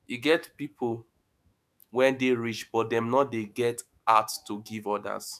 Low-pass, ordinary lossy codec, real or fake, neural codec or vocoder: 14.4 kHz; none; fake; autoencoder, 48 kHz, 128 numbers a frame, DAC-VAE, trained on Japanese speech